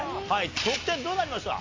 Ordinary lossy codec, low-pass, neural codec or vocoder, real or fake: AAC, 32 kbps; 7.2 kHz; none; real